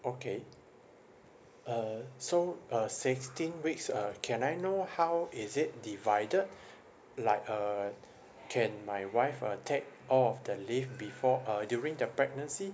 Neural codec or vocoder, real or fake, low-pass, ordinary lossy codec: none; real; none; none